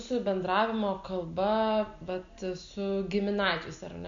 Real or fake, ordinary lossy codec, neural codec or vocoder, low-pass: real; Opus, 64 kbps; none; 7.2 kHz